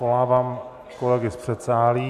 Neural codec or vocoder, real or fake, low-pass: none; real; 14.4 kHz